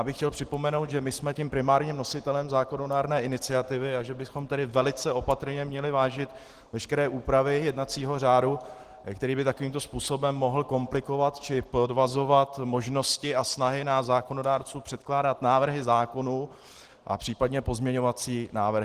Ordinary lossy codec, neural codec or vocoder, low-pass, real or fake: Opus, 16 kbps; none; 14.4 kHz; real